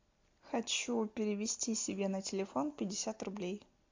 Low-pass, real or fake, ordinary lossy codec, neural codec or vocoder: 7.2 kHz; real; MP3, 64 kbps; none